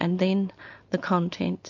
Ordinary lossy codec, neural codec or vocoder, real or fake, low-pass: AAC, 48 kbps; none; real; 7.2 kHz